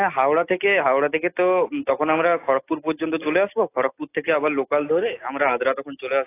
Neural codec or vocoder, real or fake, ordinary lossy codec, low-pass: none; real; AAC, 24 kbps; 3.6 kHz